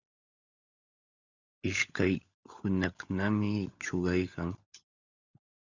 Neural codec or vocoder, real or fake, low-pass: codec, 16 kHz, 16 kbps, FunCodec, trained on LibriTTS, 50 frames a second; fake; 7.2 kHz